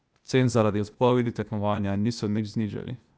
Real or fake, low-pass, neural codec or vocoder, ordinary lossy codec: fake; none; codec, 16 kHz, 0.8 kbps, ZipCodec; none